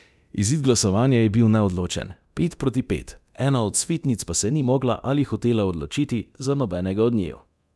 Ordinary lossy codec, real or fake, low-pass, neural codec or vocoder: none; fake; none; codec, 24 kHz, 0.9 kbps, DualCodec